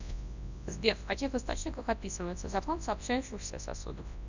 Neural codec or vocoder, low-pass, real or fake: codec, 24 kHz, 0.9 kbps, WavTokenizer, large speech release; 7.2 kHz; fake